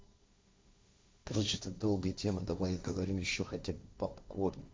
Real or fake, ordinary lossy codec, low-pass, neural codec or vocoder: fake; none; none; codec, 16 kHz, 1.1 kbps, Voila-Tokenizer